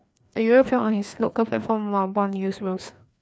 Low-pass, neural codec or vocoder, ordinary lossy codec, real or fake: none; codec, 16 kHz, 2 kbps, FreqCodec, larger model; none; fake